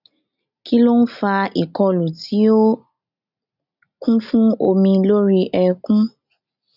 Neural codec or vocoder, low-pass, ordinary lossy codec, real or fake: none; 5.4 kHz; none; real